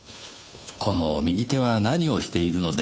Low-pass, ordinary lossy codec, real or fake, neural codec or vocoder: none; none; fake; codec, 16 kHz, 2 kbps, FunCodec, trained on Chinese and English, 25 frames a second